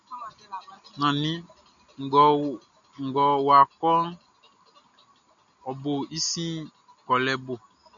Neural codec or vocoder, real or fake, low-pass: none; real; 7.2 kHz